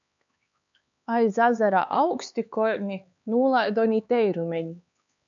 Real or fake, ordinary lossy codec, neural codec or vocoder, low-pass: fake; MP3, 96 kbps; codec, 16 kHz, 4 kbps, X-Codec, HuBERT features, trained on LibriSpeech; 7.2 kHz